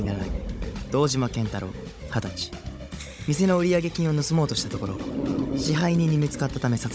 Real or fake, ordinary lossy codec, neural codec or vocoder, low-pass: fake; none; codec, 16 kHz, 16 kbps, FunCodec, trained on Chinese and English, 50 frames a second; none